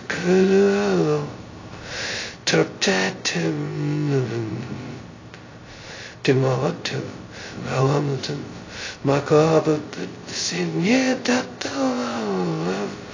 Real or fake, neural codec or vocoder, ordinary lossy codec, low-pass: fake; codec, 16 kHz, 0.2 kbps, FocalCodec; AAC, 32 kbps; 7.2 kHz